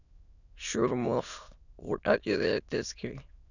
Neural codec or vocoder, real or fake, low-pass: autoencoder, 22.05 kHz, a latent of 192 numbers a frame, VITS, trained on many speakers; fake; 7.2 kHz